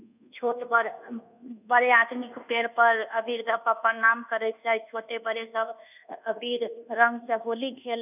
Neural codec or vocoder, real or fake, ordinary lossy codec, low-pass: codec, 24 kHz, 1.2 kbps, DualCodec; fake; none; 3.6 kHz